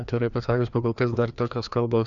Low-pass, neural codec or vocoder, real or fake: 7.2 kHz; codec, 16 kHz, 2 kbps, FreqCodec, larger model; fake